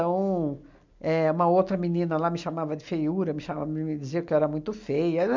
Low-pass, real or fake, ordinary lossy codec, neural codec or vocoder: 7.2 kHz; real; MP3, 64 kbps; none